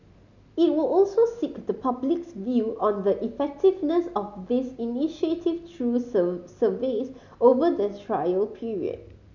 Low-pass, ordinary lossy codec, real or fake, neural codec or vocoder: 7.2 kHz; none; real; none